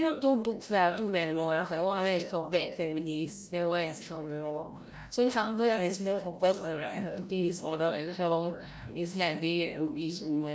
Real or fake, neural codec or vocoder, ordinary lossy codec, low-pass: fake; codec, 16 kHz, 0.5 kbps, FreqCodec, larger model; none; none